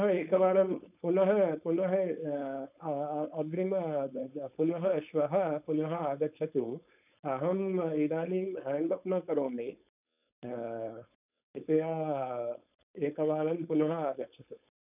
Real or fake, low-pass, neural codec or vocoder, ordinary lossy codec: fake; 3.6 kHz; codec, 16 kHz, 4.8 kbps, FACodec; none